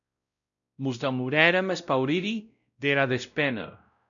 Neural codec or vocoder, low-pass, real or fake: codec, 16 kHz, 0.5 kbps, X-Codec, WavLM features, trained on Multilingual LibriSpeech; 7.2 kHz; fake